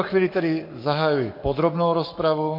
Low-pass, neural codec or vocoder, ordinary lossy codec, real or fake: 5.4 kHz; codec, 44.1 kHz, 7.8 kbps, Pupu-Codec; MP3, 32 kbps; fake